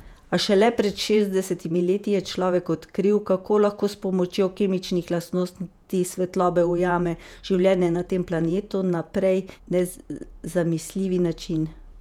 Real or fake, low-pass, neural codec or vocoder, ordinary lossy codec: fake; 19.8 kHz; vocoder, 48 kHz, 128 mel bands, Vocos; none